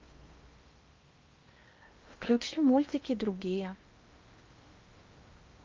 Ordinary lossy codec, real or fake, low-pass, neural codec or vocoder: Opus, 32 kbps; fake; 7.2 kHz; codec, 16 kHz in and 24 kHz out, 0.6 kbps, FocalCodec, streaming, 4096 codes